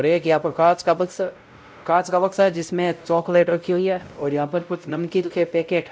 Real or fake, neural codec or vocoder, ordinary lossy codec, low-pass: fake; codec, 16 kHz, 0.5 kbps, X-Codec, WavLM features, trained on Multilingual LibriSpeech; none; none